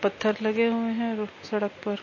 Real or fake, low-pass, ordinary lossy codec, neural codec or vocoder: real; 7.2 kHz; MP3, 32 kbps; none